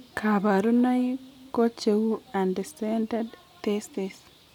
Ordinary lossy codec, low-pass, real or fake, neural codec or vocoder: none; 19.8 kHz; real; none